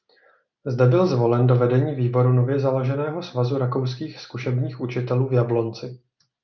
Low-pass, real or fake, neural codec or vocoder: 7.2 kHz; real; none